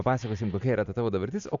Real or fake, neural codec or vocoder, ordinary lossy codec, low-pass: real; none; AAC, 64 kbps; 7.2 kHz